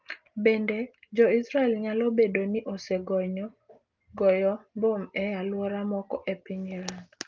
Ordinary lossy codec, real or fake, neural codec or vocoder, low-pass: Opus, 32 kbps; real; none; 7.2 kHz